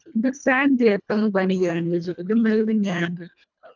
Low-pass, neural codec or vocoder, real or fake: 7.2 kHz; codec, 24 kHz, 1.5 kbps, HILCodec; fake